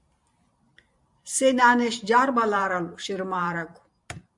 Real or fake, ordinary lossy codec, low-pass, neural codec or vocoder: fake; MP3, 64 kbps; 10.8 kHz; vocoder, 44.1 kHz, 128 mel bands every 512 samples, BigVGAN v2